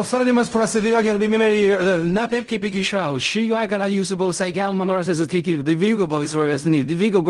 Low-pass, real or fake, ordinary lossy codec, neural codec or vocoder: 10.8 kHz; fake; Opus, 24 kbps; codec, 16 kHz in and 24 kHz out, 0.4 kbps, LongCat-Audio-Codec, fine tuned four codebook decoder